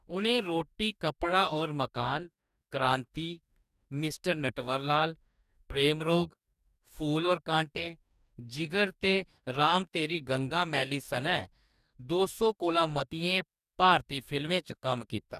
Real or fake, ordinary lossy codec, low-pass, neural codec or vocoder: fake; none; 14.4 kHz; codec, 44.1 kHz, 2.6 kbps, DAC